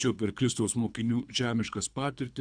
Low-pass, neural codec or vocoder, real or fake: 9.9 kHz; codec, 24 kHz, 3 kbps, HILCodec; fake